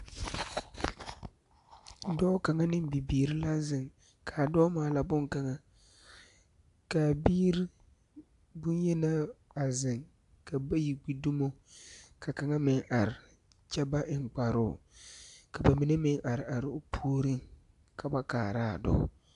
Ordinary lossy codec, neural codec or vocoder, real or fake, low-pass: AAC, 96 kbps; none; real; 10.8 kHz